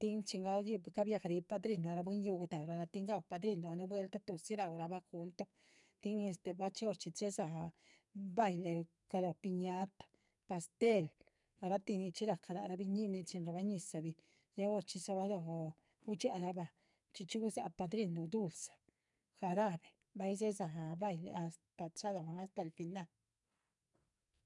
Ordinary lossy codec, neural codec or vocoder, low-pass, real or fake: none; codec, 44.1 kHz, 2.6 kbps, SNAC; 10.8 kHz; fake